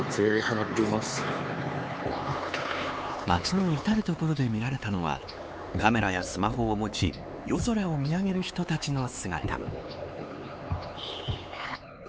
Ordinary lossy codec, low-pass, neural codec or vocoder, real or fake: none; none; codec, 16 kHz, 4 kbps, X-Codec, HuBERT features, trained on LibriSpeech; fake